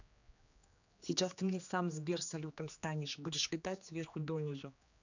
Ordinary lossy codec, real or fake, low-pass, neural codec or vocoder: none; fake; 7.2 kHz; codec, 16 kHz, 2 kbps, X-Codec, HuBERT features, trained on general audio